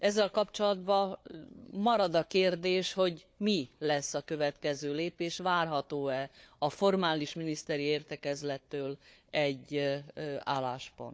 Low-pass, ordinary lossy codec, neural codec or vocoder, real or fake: none; none; codec, 16 kHz, 4 kbps, FunCodec, trained on Chinese and English, 50 frames a second; fake